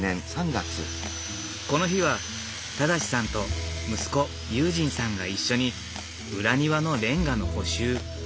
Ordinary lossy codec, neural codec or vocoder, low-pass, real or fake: none; none; none; real